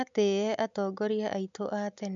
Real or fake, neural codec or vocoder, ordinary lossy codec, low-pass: real; none; none; 7.2 kHz